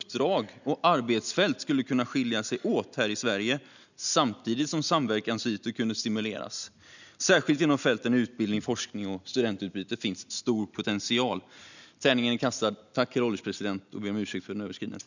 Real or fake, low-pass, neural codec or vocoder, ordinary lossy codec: real; 7.2 kHz; none; none